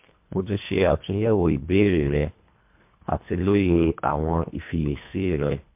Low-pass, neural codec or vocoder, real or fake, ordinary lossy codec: 3.6 kHz; codec, 24 kHz, 1.5 kbps, HILCodec; fake; MP3, 32 kbps